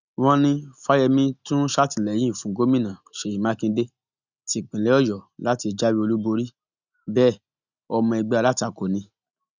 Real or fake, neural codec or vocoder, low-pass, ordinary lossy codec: real; none; 7.2 kHz; none